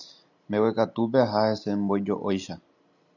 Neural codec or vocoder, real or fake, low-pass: none; real; 7.2 kHz